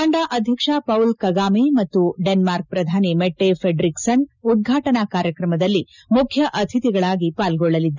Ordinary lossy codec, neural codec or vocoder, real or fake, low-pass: none; none; real; 7.2 kHz